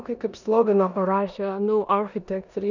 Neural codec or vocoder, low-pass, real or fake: codec, 16 kHz in and 24 kHz out, 0.9 kbps, LongCat-Audio-Codec, four codebook decoder; 7.2 kHz; fake